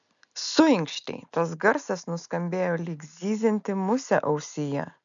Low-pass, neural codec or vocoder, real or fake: 7.2 kHz; none; real